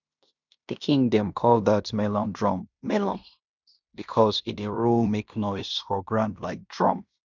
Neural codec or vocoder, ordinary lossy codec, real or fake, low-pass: codec, 16 kHz in and 24 kHz out, 0.9 kbps, LongCat-Audio-Codec, fine tuned four codebook decoder; none; fake; 7.2 kHz